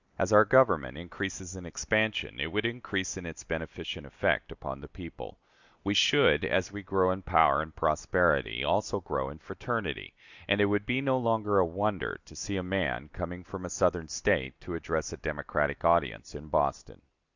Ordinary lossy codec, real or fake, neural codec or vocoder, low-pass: Opus, 64 kbps; real; none; 7.2 kHz